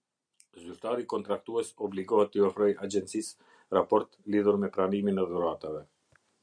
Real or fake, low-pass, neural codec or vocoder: real; 9.9 kHz; none